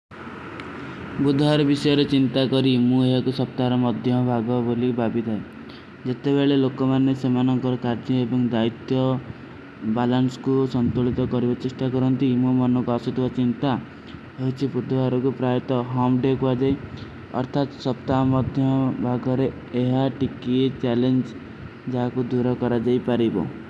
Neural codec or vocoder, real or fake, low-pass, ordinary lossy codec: none; real; none; none